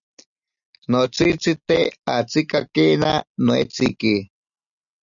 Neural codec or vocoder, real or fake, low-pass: none; real; 7.2 kHz